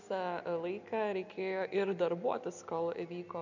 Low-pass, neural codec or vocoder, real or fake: 7.2 kHz; none; real